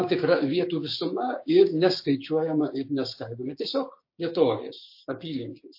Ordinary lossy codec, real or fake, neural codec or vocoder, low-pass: MP3, 32 kbps; fake; codec, 16 kHz, 6 kbps, DAC; 5.4 kHz